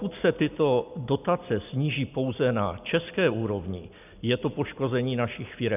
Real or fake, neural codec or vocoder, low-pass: real; none; 3.6 kHz